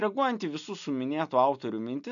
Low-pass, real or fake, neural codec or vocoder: 7.2 kHz; real; none